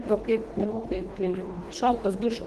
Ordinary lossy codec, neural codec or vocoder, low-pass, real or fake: Opus, 16 kbps; codec, 24 kHz, 1.5 kbps, HILCodec; 10.8 kHz; fake